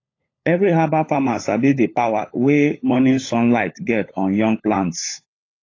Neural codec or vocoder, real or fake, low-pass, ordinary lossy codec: codec, 16 kHz, 16 kbps, FunCodec, trained on LibriTTS, 50 frames a second; fake; 7.2 kHz; AAC, 32 kbps